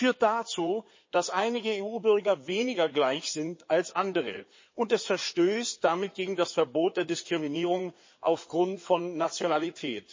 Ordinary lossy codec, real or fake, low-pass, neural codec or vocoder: MP3, 32 kbps; fake; 7.2 kHz; codec, 16 kHz in and 24 kHz out, 2.2 kbps, FireRedTTS-2 codec